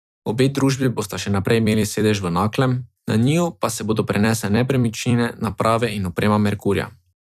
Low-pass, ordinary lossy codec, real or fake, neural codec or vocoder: 14.4 kHz; none; fake; vocoder, 44.1 kHz, 128 mel bands every 256 samples, BigVGAN v2